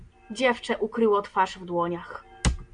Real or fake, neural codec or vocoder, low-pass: real; none; 9.9 kHz